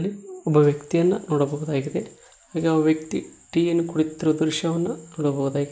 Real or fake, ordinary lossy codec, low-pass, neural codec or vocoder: real; none; none; none